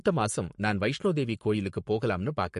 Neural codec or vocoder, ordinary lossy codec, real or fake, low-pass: codec, 44.1 kHz, 7.8 kbps, Pupu-Codec; MP3, 48 kbps; fake; 14.4 kHz